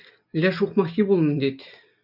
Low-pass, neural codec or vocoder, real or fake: 5.4 kHz; none; real